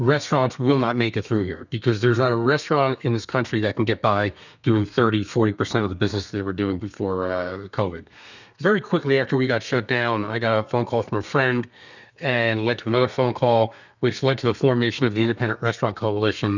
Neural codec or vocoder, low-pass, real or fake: codec, 32 kHz, 1.9 kbps, SNAC; 7.2 kHz; fake